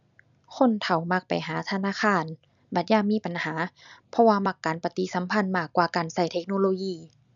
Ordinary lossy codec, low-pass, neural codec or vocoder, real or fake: none; 7.2 kHz; none; real